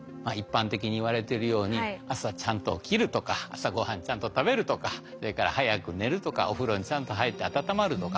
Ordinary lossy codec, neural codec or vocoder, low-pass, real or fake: none; none; none; real